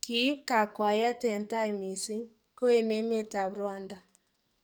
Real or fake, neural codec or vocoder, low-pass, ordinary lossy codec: fake; codec, 44.1 kHz, 2.6 kbps, SNAC; none; none